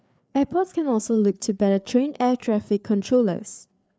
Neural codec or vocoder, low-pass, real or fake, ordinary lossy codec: codec, 16 kHz, 4 kbps, FreqCodec, larger model; none; fake; none